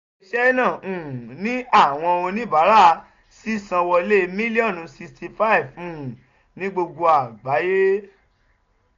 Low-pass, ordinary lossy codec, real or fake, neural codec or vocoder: 7.2 kHz; AAC, 48 kbps; real; none